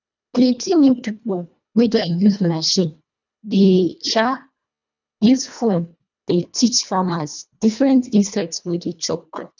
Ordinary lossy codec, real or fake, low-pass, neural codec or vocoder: none; fake; 7.2 kHz; codec, 24 kHz, 1.5 kbps, HILCodec